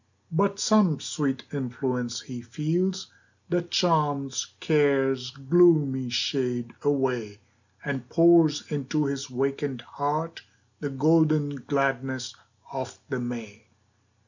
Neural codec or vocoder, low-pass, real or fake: none; 7.2 kHz; real